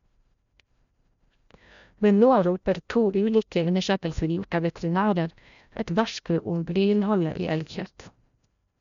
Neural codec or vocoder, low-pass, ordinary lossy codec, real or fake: codec, 16 kHz, 0.5 kbps, FreqCodec, larger model; 7.2 kHz; none; fake